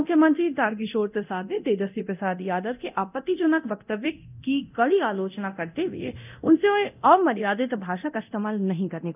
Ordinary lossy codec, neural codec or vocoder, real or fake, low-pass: none; codec, 24 kHz, 0.9 kbps, DualCodec; fake; 3.6 kHz